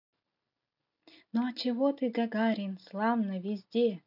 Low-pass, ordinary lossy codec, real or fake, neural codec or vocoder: 5.4 kHz; none; real; none